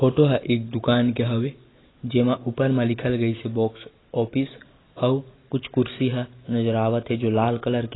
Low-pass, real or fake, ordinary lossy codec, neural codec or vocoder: 7.2 kHz; real; AAC, 16 kbps; none